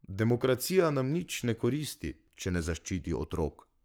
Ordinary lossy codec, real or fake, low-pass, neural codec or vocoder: none; fake; none; codec, 44.1 kHz, 7.8 kbps, Pupu-Codec